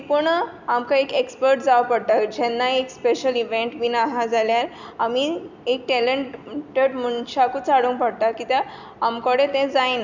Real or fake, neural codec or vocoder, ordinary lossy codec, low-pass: real; none; none; 7.2 kHz